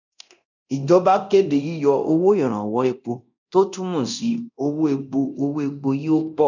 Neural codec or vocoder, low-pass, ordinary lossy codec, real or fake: codec, 24 kHz, 0.9 kbps, DualCodec; 7.2 kHz; none; fake